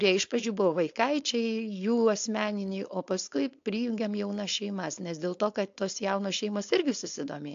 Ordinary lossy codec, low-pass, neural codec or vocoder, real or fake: AAC, 48 kbps; 7.2 kHz; codec, 16 kHz, 4.8 kbps, FACodec; fake